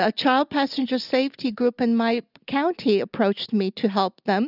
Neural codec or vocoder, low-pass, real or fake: none; 5.4 kHz; real